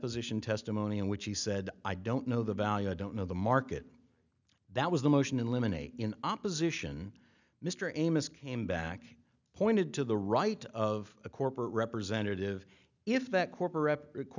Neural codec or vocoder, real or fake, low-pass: none; real; 7.2 kHz